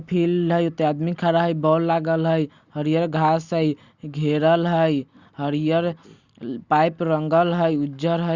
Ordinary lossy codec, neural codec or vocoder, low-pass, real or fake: Opus, 64 kbps; none; 7.2 kHz; real